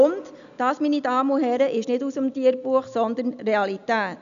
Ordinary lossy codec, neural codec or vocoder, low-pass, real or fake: none; none; 7.2 kHz; real